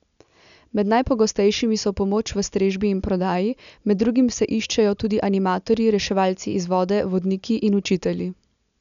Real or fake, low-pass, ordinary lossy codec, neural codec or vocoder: real; 7.2 kHz; none; none